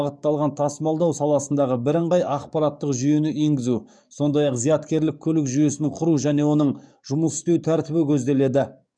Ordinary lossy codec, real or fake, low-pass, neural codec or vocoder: none; fake; 9.9 kHz; codec, 44.1 kHz, 7.8 kbps, DAC